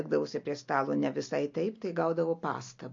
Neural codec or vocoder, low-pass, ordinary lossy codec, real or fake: none; 7.2 kHz; MP3, 48 kbps; real